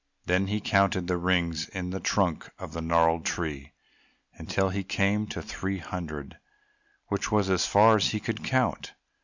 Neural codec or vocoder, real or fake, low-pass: none; real; 7.2 kHz